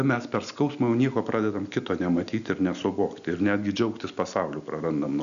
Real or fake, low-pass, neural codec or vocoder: real; 7.2 kHz; none